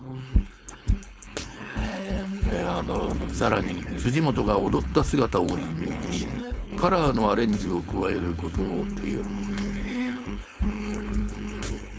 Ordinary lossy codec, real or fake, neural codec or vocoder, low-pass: none; fake; codec, 16 kHz, 4.8 kbps, FACodec; none